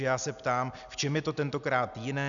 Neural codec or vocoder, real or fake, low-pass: none; real; 7.2 kHz